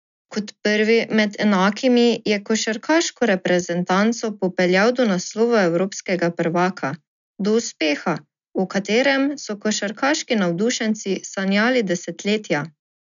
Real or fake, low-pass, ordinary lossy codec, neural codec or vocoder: real; 7.2 kHz; none; none